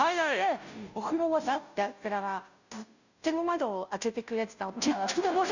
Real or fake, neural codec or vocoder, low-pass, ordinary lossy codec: fake; codec, 16 kHz, 0.5 kbps, FunCodec, trained on Chinese and English, 25 frames a second; 7.2 kHz; none